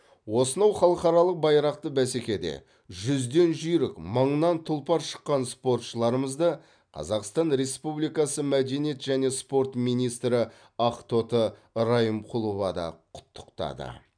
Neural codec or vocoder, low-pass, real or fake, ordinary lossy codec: none; 9.9 kHz; real; MP3, 96 kbps